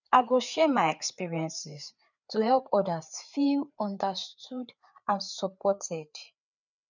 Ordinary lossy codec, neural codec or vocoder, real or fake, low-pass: none; codec, 16 kHz, 4 kbps, FreqCodec, larger model; fake; 7.2 kHz